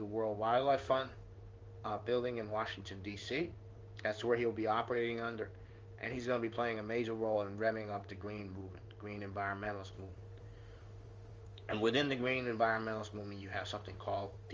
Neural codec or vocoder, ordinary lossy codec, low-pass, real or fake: codec, 16 kHz in and 24 kHz out, 1 kbps, XY-Tokenizer; Opus, 32 kbps; 7.2 kHz; fake